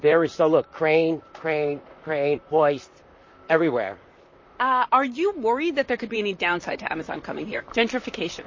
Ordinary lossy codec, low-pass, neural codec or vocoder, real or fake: MP3, 32 kbps; 7.2 kHz; vocoder, 44.1 kHz, 128 mel bands, Pupu-Vocoder; fake